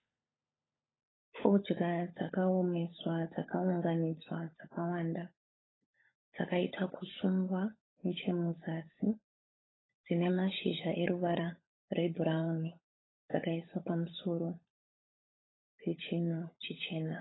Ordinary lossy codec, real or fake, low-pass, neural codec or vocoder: AAC, 16 kbps; fake; 7.2 kHz; codec, 16 kHz, 16 kbps, FunCodec, trained on LibriTTS, 50 frames a second